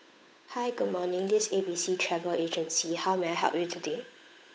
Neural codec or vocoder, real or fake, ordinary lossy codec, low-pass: codec, 16 kHz, 8 kbps, FunCodec, trained on Chinese and English, 25 frames a second; fake; none; none